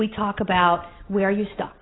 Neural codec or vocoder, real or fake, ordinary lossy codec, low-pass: none; real; AAC, 16 kbps; 7.2 kHz